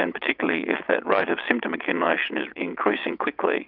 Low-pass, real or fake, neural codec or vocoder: 5.4 kHz; fake; vocoder, 22.05 kHz, 80 mel bands, WaveNeXt